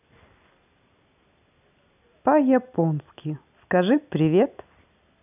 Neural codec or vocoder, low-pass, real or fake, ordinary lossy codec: none; 3.6 kHz; real; none